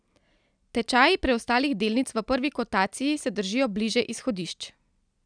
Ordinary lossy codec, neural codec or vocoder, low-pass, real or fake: none; none; 9.9 kHz; real